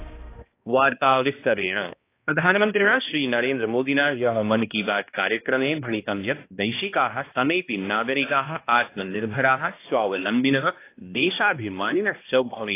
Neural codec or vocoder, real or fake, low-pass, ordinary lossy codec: codec, 16 kHz, 1 kbps, X-Codec, HuBERT features, trained on balanced general audio; fake; 3.6 kHz; AAC, 24 kbps